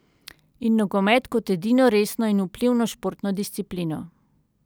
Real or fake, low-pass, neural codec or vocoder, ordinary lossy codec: real; none; none; none